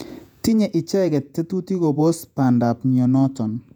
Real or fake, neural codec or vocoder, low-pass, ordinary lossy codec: fake; vocoder, 48 kHz, 128 mel bands, Vocos; 19.8 kHz; none